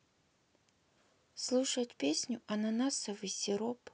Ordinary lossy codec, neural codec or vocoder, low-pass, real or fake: none; none; none; real